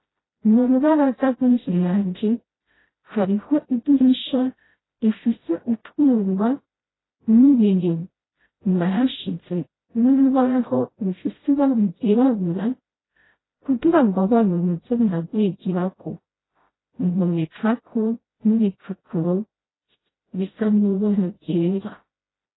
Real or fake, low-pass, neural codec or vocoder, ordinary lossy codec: fake; 7.2 kHz; codec, 16 kHz, 0.5 kbps, FreqCodec, smaller model; AAC, 16 kbps